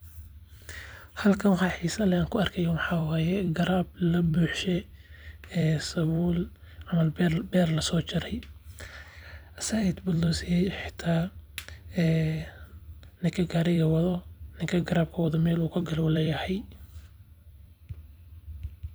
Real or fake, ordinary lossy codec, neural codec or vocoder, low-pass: fake; none; vocoder, 44.1 kHz, 128 mel bands every 256 samples, BigVGAN v2; none